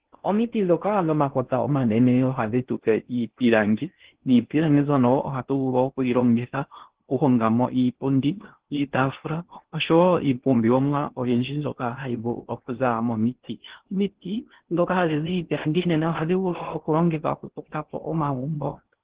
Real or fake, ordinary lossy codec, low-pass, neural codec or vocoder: fake; Opus, 16 kbps; 3.6 kHz; codec, 16 kHz in and 24 kHz out, 0.6 kbps, FocalCodec, streaming, 4096 codes